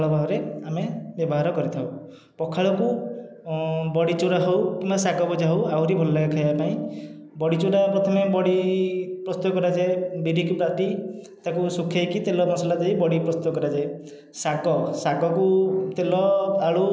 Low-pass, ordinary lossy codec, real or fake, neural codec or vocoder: none; none; real; none